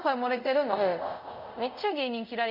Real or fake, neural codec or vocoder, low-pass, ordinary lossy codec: fake; codec, 24 kHz, 0.5 kbps, DualCodec; 5.4 kHz; none